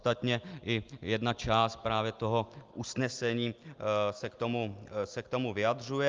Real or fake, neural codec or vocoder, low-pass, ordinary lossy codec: real; none; 7.2 kHz; Opus, 32 kbps